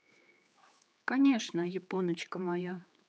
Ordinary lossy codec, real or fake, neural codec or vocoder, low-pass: none; fake; codec, 16 kHz, 4 kbps, X-Codec, HuBERT features, trained on general audio; none